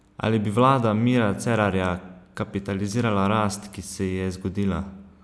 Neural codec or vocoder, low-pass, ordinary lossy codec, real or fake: none; none; none; real